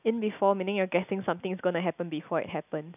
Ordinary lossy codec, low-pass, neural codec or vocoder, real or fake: none; 3.6 kHz; none; real